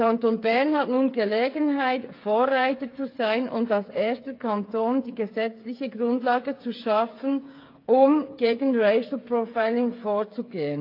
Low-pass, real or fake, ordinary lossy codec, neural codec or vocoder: 5.4 kHz; fake; AAC, 32 kbps; codec, 16 kHz, 8 kbps, FreqCodec, smaller model